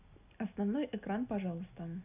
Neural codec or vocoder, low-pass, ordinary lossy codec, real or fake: none; 3.6 kHz; none; real